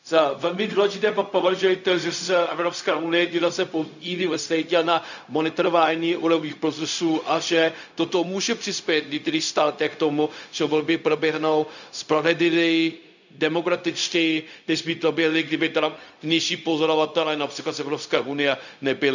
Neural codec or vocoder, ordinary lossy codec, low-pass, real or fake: codec, 16 kHz, 0.4 kbps, LongCat-Audio-Codec; none; 7.2 kHz; fake